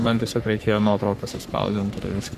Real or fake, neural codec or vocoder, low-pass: fake; codec, 44.1 kHz, 3.4 kbps, Pupu-Codec; 14.4 kHz